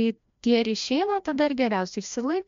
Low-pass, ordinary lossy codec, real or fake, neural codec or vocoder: 7.2 kHz; MP3, 64 kbps; fake; codec, 16 kHz, 1 kbps, FreqCodec, larger model